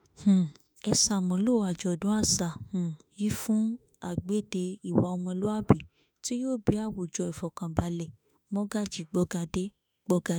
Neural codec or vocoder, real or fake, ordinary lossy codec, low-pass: autoencoder, 48 kHz, 32 numbers a frame, DAC-VAE, trained on Japanese speech; fake; none; none